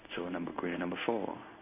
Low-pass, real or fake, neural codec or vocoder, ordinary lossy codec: 3.6 kHz; fake; codec, 16 kHz in and 24 kHz out, 1 kbps, XY-Tokenizer; none